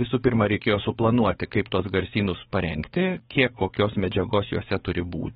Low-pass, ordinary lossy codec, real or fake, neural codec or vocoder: 19.8 kHz; AAC, 16 kbps; fake; codec, 44.1 kHz, 7.8 kbps, DAC